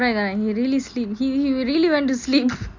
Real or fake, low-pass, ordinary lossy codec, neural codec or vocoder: real; 7.2 kHz; MP3, 64 kbps; none